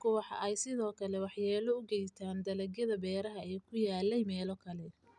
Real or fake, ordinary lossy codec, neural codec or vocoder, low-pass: real; none; none; 10.8 kHz